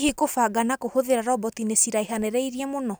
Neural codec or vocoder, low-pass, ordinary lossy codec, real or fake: none; none; none; real